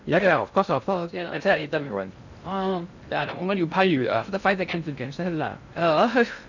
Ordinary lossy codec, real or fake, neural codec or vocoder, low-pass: none; fake; codec, 16 kHz in and 24 kHz out, 0.6 kbps, FocalCodec, streaming, 2048 codes; 7.2 kHz